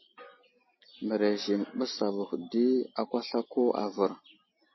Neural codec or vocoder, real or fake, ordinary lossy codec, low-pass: none; real; MP3, 24 kbps; 7.2 kHz